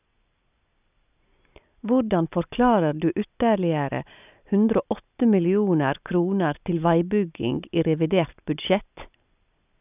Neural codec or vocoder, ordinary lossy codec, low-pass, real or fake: none; none; 3.6 kHz; real